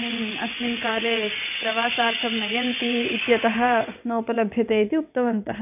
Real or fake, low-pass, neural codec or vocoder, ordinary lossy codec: fake; 3.6 kHz; vocoder, 22.05 kHz, 80 mel bands, WaveNeXt; none